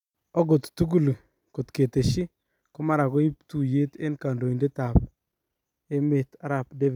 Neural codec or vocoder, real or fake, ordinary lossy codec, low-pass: none; real; none; 19.8 kHz